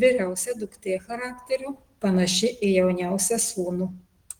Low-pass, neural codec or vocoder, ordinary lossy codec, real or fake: 19.8 kHz; none; Opus, 16 kbps; real